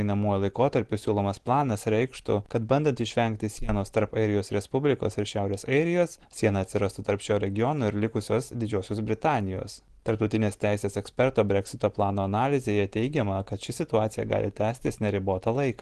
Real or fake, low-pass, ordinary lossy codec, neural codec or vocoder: real; 10.8 kHz; Opus, 16 kbps; none